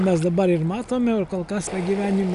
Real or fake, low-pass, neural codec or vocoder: real; 10.8 kHz; none